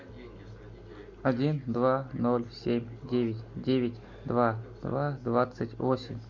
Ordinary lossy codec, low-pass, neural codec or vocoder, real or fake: MP3, 64 kbps; 7.2 kHz; none; real